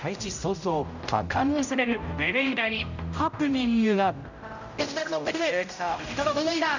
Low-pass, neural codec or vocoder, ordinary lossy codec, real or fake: 7.2 kHz; codec, 16 kHz, 0.5 kbps, X-Codec, HuBERT features, trained on general audio; none; fake